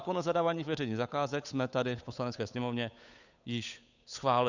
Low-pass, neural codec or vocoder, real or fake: 7.2 kHz; codec, 16 kHz, 8 kbps, FunCodec, trained on Chinese and English, 25 frames a second; fake